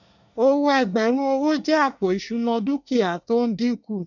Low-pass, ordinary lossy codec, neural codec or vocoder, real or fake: 7.2 kHz; none; codec, 24 kHz, 1 kbps, SNAC; fake